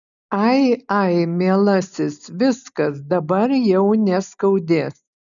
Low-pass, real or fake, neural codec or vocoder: 7.2 kHz; real; none